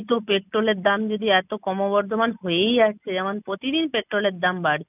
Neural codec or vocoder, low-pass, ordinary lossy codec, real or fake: none; 3.6 kHz; none; real